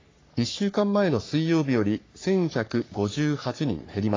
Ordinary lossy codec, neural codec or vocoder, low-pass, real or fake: AAC, 32 kbps; codec, 44.1 kHz, 3.4 kbps, Pupu-Codec; 7.2 kHz; fake